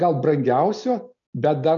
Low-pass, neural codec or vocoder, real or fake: 7.2 kHz; none; real